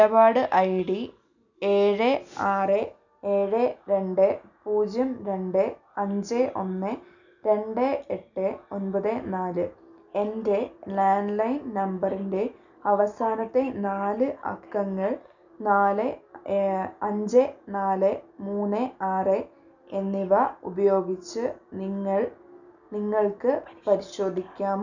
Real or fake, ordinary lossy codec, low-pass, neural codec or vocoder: real; none; 7.2 kHz; none